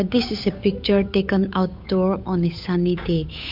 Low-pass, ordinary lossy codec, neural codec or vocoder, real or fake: 5.4 kHz; MP3, 48 kbps; codec, 16 kHz, 8 kbps, FunCodec, trained on Chinese and English, 25 frames a second; fake